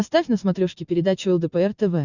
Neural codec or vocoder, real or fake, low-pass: none; real; 7.2 kHz